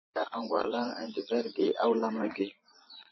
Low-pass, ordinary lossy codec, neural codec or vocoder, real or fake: 7.2 kHz; MP3, 24 kbps; vocoder, 22.05 kHz, 80 mel bands, Vocos; fake